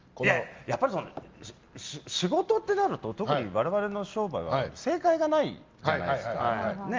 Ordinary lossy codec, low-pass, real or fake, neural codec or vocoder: Opus, 32 kbps; 7.2 kHz; real; none